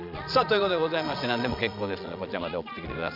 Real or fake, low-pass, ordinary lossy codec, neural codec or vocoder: fake; 5.4 kHz; none; vocoder, 22.05 kHz, 80 mel bands, Vocos